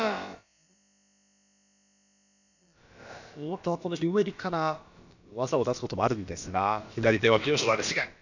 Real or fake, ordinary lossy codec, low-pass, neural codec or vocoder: fake; none; 7.2 kHz; codec, 16 kHz, about 1 kbps, DyCAST, with the encoder's durations